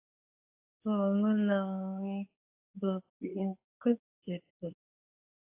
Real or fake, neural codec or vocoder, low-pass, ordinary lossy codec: fake; codec, 24 kHz, 0.9 kbps, WavTokenizer, medium speech release version 2; 3.6 kHz; AAC, 32 kbps